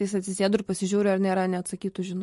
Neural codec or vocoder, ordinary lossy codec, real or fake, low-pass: none; MP3, 48 kbps; real; 14.4 kHz